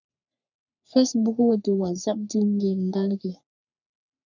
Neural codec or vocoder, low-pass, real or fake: codec, 44.1 kHz, 3.4 kbps, Pupu-Codec; 7.2 kHz; fake